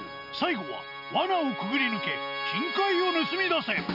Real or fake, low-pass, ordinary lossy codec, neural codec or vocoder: real; 5.4 kHz; none; none